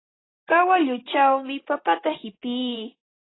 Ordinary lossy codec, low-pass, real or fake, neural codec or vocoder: AAC, 16 kbps; 7.2 kHz; fake; vocoder, 44.1 kHz, 128 mel bands, Pupu-Vocoder